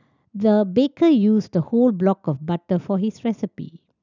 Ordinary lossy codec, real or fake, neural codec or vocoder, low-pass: none; real; none; 7.2 kHz